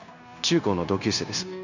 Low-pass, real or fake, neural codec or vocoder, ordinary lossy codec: 7.2 kHz; fake; codec, 16 kHz, 0.9 kbps, LongCat-Audio-Codec; none